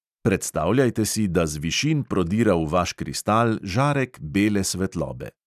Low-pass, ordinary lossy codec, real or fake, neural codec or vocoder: 14.4 kHz; none; real; none